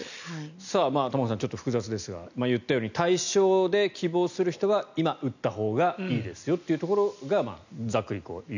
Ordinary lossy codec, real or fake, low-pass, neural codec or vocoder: none; real; 7.2 kHz; none